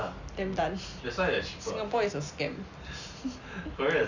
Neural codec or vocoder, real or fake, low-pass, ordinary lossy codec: none; real; 7.2 kHz; Opus, 64 kbps